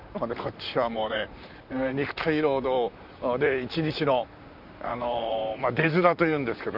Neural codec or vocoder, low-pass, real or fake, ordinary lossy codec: vocoder, 44.1 kHz, 128 mel bands, Pupu-Vocoder; 5.4 kHz; fake; none